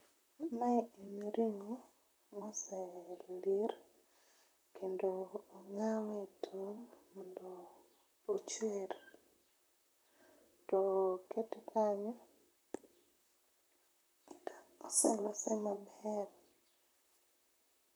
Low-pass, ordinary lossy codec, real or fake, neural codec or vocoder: none; none; fake; vocoder, 44.1 kHz, 128 mel bands, Pupu-Vocoder